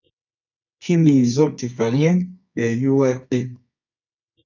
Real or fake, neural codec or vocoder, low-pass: fake; codec, 24 kHz, 0.9 kbps, WavTokenizer, medium music audio release; 7.2 kHz